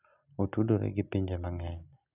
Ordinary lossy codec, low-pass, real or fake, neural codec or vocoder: none; 3.6 kHz; real; none